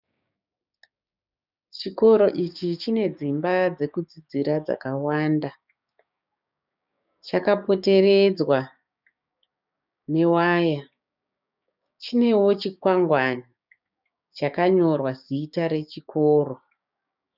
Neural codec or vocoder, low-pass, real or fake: codec, 16 kHz, 6 kbps, DAC; 5.4 kHz; fake